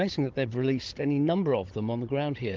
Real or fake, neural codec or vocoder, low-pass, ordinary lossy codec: fake; codec, 16 kHz, 16 kbps, FunCodec, trained on Chinese and English, 50 frames a second; 7.2 kHz; Opus, 16 kbps